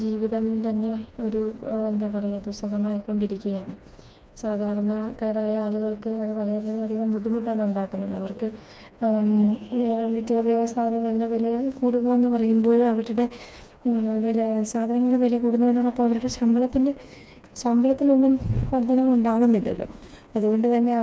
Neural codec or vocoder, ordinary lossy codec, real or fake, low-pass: codec, 16 kHz, 2 kbps, FreqCodec, smaller model; none; fake; none